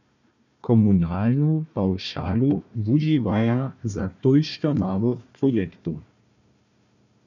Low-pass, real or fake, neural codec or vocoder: 7.2 kHz; fake; codec, 16 kHz, 1 kbps, FunCodec, trained on Chinese and English, 50 frames a second